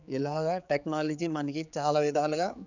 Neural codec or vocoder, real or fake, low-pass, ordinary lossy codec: codec, 16 kHz, 4 kbps, X-Codec, HuBERT features, trained on general audio; fake; 7.2 kHz; none